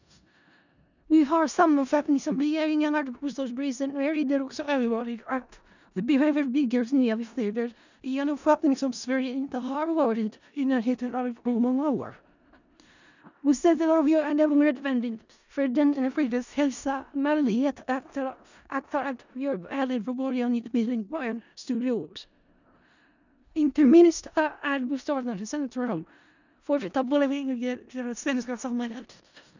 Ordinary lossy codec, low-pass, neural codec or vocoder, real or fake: none; 7.2 kHz; codec, 16 kHz in and 24 kHz out, 0.4 kbps, LongCat-Audio-Codec, four codebook decoder; fake